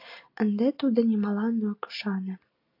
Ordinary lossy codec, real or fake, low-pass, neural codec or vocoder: AAC, 48 kbps; real; 5.4 kHz; none